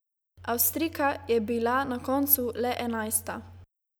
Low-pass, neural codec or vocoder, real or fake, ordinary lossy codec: none; none; real; none